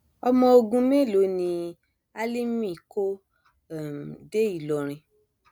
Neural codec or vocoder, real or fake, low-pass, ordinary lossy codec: none; real; none; none